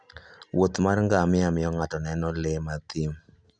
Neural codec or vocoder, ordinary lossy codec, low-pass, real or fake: none; none; 9.9 kHz; real